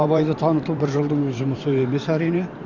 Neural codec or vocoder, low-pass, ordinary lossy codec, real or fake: vocoder, 44.1 kHz, 128 mel bands every 512 samples, BigVGAN v2; 7.2 kHz; none; fake